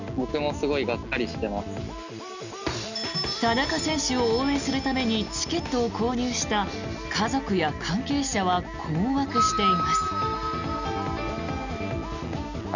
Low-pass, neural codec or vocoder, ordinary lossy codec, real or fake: 7.2 kHz; none; none; real